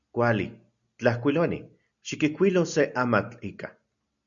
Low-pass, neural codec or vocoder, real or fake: 7.2 kHz; none; real